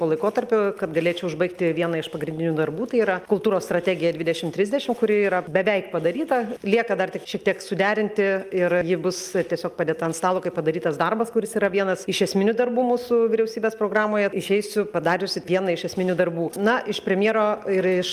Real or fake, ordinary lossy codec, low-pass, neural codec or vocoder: real; Opus, 32 kbps; 14.4 kHz; none